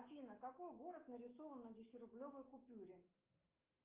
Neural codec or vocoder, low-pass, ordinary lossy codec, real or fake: autoencoder, 48 kHz, 128 numbers a frame, DAC-VAE, trained on Japanese speech; 3.6 kHz; Opus, 24 kbps; fake